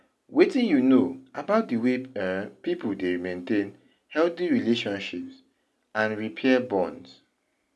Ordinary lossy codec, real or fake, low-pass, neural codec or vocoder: none; real; none; none